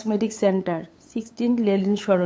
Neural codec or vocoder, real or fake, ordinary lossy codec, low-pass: codec, 16 kHz, 8 kbps, FunCodec, trained on LibriTTS, 25 frames a second; fake; none; none